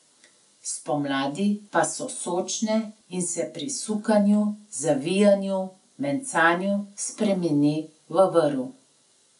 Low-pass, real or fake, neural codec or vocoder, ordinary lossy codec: 10.8 kHz; real; none; none